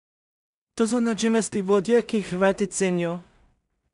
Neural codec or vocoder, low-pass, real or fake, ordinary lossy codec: codec, 16 kHz in and 24 kHz out, 0.4 kbps, LongCat-Audio-Codec, two codebook decoder; 10.8 kHz; fake; none